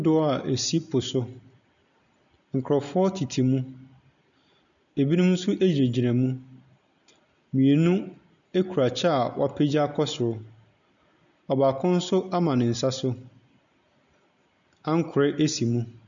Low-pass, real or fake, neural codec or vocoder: 7.2 kHz; real; none